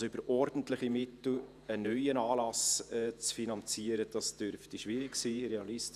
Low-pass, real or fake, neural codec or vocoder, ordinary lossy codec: 14.4 kHz; fake; vocoder, 48 kHz, 128 mel bands, Vocos; none